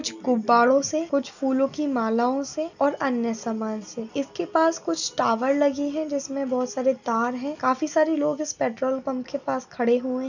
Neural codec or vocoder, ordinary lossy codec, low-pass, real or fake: none; none; 7.2 kHz; real